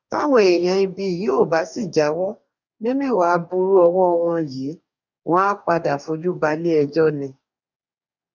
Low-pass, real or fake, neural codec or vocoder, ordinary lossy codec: 7.2 kHz; fake; codec, 44.1 kHz, 2.6 kbps, DAC; none